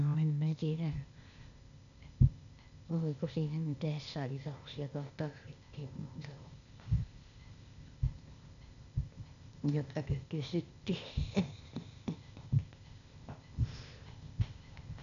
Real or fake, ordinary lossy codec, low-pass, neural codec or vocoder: fake; none; 7.2 kHz; codec, 16 kHz, 0.8 kbps, ZipCodec